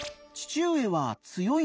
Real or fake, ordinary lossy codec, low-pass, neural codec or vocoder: real; none; none; none